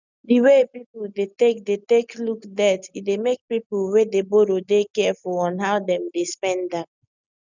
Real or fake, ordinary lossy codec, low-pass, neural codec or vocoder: real; none; 7.2 kHz; none